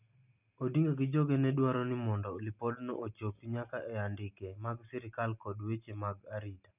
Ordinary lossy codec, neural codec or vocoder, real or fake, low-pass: none; none; real; 3.6 kHz